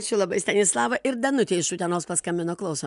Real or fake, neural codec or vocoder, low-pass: real; none; 10.8 kHz